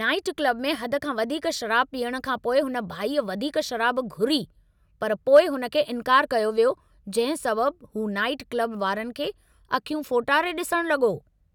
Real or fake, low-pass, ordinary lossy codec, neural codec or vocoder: real; 19.8 kHz; none; none